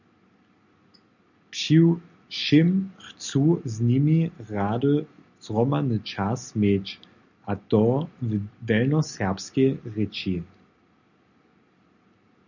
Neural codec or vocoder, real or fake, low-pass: none; real; 7.2 kHz